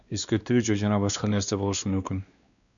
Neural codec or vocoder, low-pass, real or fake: codec, 16 kHz, 2 kbps, X-Codec, WavLM features, trained on Multilingual LibriSpeech; 7.2 kHz; fake